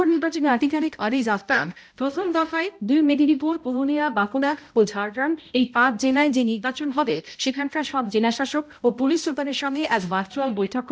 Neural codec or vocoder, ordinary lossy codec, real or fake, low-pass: codec, 16 kHz, 0.5 kbps, X-Codec, HuBERT features, trained on balanced general audio; none; fake; none